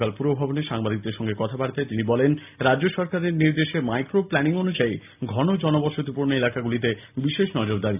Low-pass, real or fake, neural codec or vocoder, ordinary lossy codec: 3.6 kHz; real; none; AAC, 32 kbps